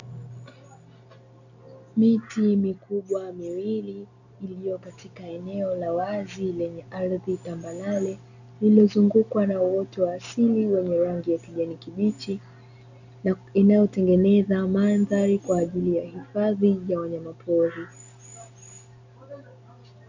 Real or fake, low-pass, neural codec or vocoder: real; 7.2 kHz; none